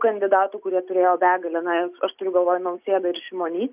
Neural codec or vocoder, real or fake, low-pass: none; real; 3.6 kHz